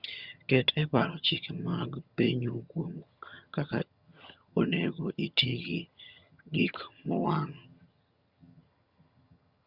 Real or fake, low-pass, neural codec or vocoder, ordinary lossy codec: fake; 5.4 kHz; vocoder, 22.05 kHz, 80 mel bands, HiFi-GAN; Opus, 64 kbps